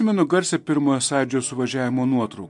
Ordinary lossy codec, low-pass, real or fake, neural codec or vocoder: MP3, 64 kbps; 10.8 kHz; real; none